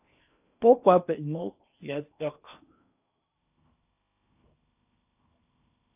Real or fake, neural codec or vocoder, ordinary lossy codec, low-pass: fake; codec, 16 kHz in and 24 kHz out, 0.8 kbps, FocalCodec, streaming, 65536 codes; AAC, 32 kbps; 3.6 kHz